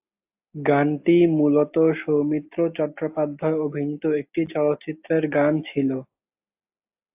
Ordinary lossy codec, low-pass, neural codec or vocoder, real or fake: AAC, 32 kbps; 3.6 kHz; none; real